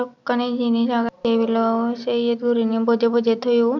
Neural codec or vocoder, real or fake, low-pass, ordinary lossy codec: none; real; 7.2 kHz; none